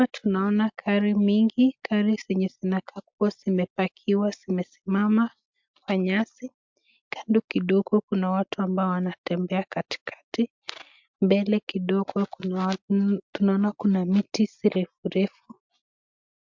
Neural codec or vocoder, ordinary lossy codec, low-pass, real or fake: none; MP3, 64 kbps; 7.2 kHz; real